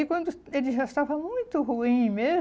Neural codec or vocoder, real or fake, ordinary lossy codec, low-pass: none; real; none; none